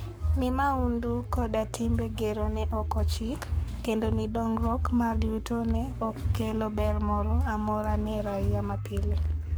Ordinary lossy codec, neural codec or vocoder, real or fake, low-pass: none; codec, 44.1 kHz, 7.8 kbps, Pupu-Codec; fake; none